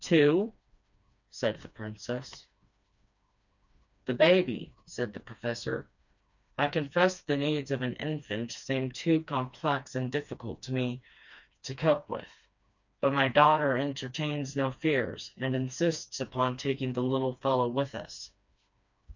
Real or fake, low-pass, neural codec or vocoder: fake; 7.2 kHz; codec, 16 kHz, 2 kbps, FreqCodec, smaller model